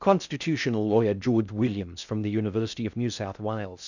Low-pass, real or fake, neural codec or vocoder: 7.2 kHz; fake; codec, 16 kHz in and 24 kHz out, 0.6 kbps, FocalCodec, streaming, 4096 codes